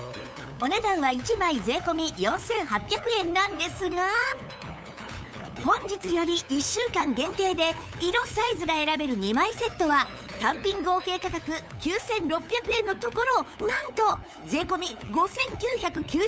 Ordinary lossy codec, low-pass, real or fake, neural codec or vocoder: none; none; fake; codec, 16 kHz, 8 kbps, FunCodec, trained on LibriTTS, 25 frames a second